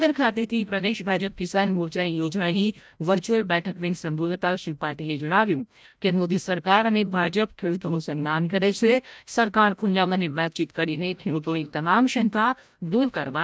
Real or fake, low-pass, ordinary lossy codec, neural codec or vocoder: fake; none; none; codec, 16 kHz, 0.5 kbps, FreqCodec, larger model